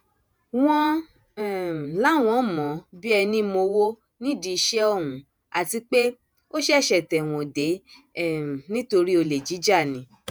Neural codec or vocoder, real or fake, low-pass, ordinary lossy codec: vocoder, 48 kHz, 128 mel bands, Vocos; fake; none; none